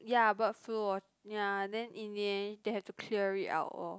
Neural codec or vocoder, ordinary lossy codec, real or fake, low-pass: none; none; real; none